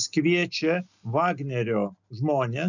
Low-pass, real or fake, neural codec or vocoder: 7.2 kHz; real; none